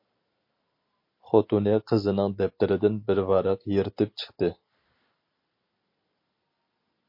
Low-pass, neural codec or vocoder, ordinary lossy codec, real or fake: 5.4 kHz; none; MP3, 32 kbps; real